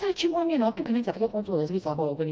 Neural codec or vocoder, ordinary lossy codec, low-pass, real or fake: codec, 16 kHz, 1 kbps, FreqCodec, smaller model; none; none; fake